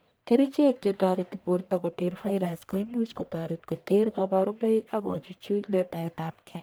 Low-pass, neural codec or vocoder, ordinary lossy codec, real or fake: none; codec, 44.1 kHz, 1.7 kbps, Pupu-Codec; none; fake